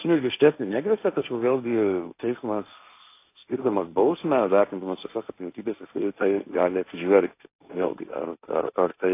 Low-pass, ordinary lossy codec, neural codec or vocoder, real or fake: 3.6 kHz; AAC, 24 kbps; codec, 16 kHz, 1.1 kbps, Voila-Tokenizer; fake